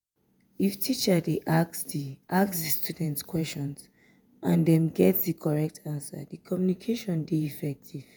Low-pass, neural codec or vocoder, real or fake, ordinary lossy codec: none; vocoder, 48 kHz, 128 mel bands, Vocos; fake; none